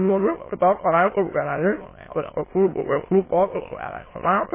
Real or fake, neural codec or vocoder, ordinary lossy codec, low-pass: fake; autoencoder, 22.05 kHz, a latent of 192 numbers a frame, VITS, trained on many speakers; MP3, 16 kbps; 3.6 kHz